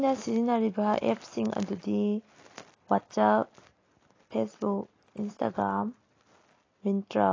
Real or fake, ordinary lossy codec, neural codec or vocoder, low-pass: real; AAC, 32 kbps; none; 7.2 kHz